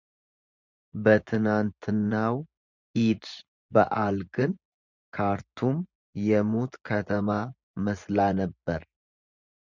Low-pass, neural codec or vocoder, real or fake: 7.2 kHz; vocoder, 24 kHz, 100 mel bands, Vocos; fake